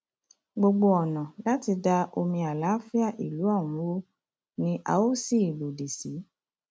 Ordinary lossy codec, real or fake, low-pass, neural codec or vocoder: none; real; none; none